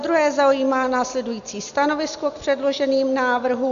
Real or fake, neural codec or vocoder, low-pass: real; none; 7.2 kHz